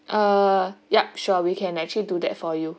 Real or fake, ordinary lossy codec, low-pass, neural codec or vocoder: real; none; none; none